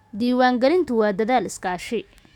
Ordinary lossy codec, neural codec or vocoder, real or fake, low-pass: none; autoencoder, 48 kHz, 128 numbers a frame, DAC-VAE, trained on Japanese speech; fake; 19.8 kHz